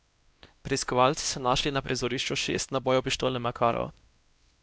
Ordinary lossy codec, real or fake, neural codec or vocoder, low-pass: none; fake; codec, 16 kHz, 1 kbps, X-Codec, WavLM features, trained on Multilingual LibriSpeech; none